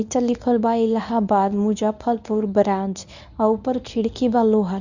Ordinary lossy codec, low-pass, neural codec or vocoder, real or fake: none; 7.2 kHz; codec, 24 kHz, 0.9 kbps, WavTokenizer, medium speech release version 1; fake